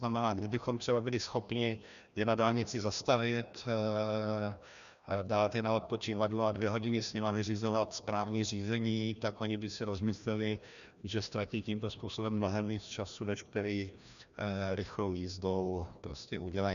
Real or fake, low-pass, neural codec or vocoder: fake; 7.2 kHz; codec, 16 kHz, 1 kbps, FreqCodec, larger model